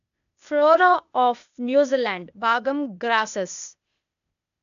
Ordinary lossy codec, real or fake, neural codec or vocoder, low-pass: none; fake; codec, 16 kHz, 0.8 kbps, ZipCodec; 7.2 kHz